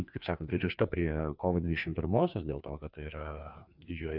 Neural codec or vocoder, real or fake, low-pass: codec, 16 kHz, 2 kbps, FreqCodec, larger model; fake; 5.4 kHz